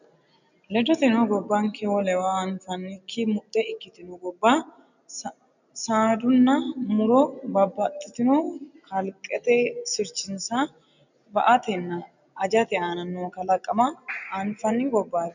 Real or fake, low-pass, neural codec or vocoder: real; 7.2 kHz; none